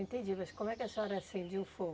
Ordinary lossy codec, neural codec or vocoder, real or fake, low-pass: none; none; real; none